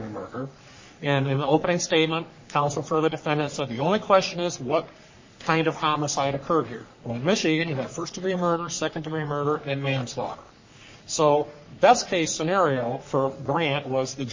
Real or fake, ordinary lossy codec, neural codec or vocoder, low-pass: fake; MP3, 32 kbps; codec, 44.1 kHz, 3.4 kbps, Pupu-Codec; 7.2 kHz